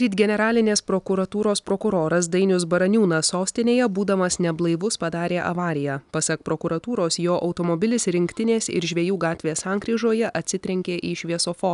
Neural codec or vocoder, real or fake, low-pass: none; real; 10.8 kHz